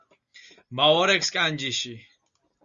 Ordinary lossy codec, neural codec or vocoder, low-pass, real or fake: Opus, 64 kbps; none; 7.2 kHz; real